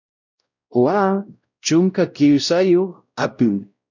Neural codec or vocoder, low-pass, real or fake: codec, 16 kHz, 0.5 kbps, X-Codec, WavLM features, trained on Multilingual LibriSpeech; 7.2 kHz; fake